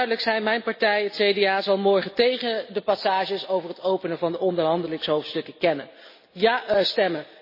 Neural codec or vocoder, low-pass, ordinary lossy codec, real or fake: none; 5.4 kHz; MP3, 24 kbps; real